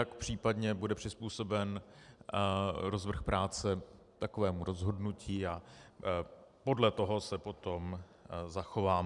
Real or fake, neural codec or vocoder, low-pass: real; none; 10.8 kHz